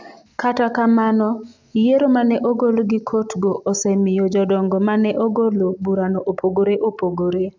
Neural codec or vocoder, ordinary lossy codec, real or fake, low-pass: none; MP3, 64 kbps; real; 7.2 kHz